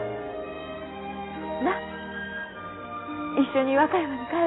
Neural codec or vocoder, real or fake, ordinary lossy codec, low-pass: none; real; AAC, 16 kbps; 7.2 kHz